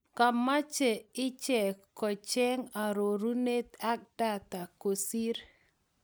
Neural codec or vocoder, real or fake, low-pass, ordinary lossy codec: none; real; none; none